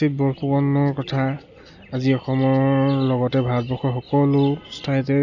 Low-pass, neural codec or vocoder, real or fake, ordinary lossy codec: 7.2 kHz; none; real; none